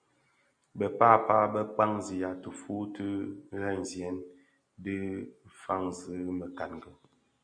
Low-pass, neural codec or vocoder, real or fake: 9.9 kHz; none; real